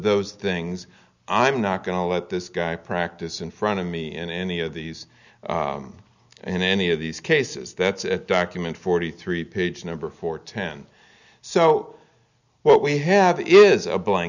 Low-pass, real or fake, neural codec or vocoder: 7.2 kHz; real; none